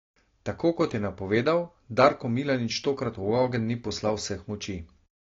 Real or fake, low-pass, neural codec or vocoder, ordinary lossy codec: real; 7.2 kHz; none; AAC, 32 kbps